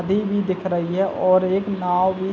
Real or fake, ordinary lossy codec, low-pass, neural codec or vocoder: real; none; none; none